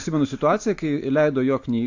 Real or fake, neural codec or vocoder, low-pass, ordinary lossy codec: real; none; 7.2 kHz; AAC, 48 kbps